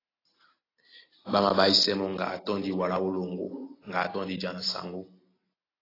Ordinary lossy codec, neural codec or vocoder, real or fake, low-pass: AAC, 24 kbps; none; real; 5.4 kHz